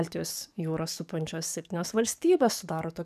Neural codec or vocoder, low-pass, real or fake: codec, 44.1 kHz, 7.8 kbps, DAC; 14.4 kHz; fake